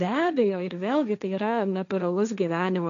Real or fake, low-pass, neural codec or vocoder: fake; 7.2 kHz; codec, 16 kHz, 1.1 kbps, Voila-Tokenizer